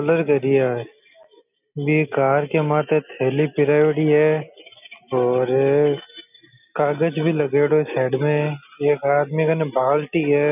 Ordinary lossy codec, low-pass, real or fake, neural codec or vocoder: none; 3.6 kHz; real; none